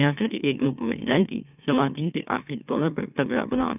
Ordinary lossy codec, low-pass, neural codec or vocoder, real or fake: none; 3.6 kHz; autoencoder, 44.1 kHz, a latent of 192 numbers a frame, MeloTTS; fake